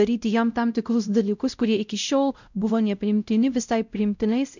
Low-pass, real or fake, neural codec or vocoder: 7.2 kHz; fake; codec, 16 kHz, 0.5 kbps, X-Codec, WavLM features, trained on Multilingual LibriSpeech